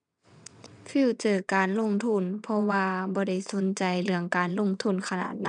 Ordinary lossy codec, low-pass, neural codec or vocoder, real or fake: none; 9.9 kHz; vocoder, 22.05 kHz, 80 mel bands, WaveNeXt; fake